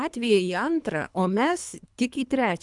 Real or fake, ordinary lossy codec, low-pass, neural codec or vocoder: fake; MP3, 96 kbps; 10.8 kHz; codec, 24 kHz, 3 kbps, HILCodec